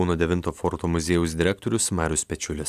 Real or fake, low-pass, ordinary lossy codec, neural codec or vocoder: real; 14.4 kHz; AAC, 96 kbps; none